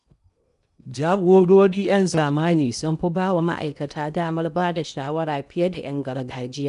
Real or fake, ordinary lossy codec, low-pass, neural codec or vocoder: fake; none; 10.8 kHz; codec, 16 kHz in and 24 kHz out, 0.8 kbps, FocalCodec, streaming, 65536 codes